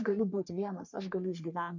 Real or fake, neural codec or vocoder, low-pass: fake; codec, 44.1 kHz, 3.4 kbps, Pupu-Codec; 7.2 kHz